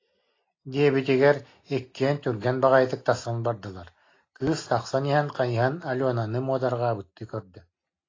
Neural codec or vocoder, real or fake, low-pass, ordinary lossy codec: none; real; 7.2 kHz; AAC, 32 kbps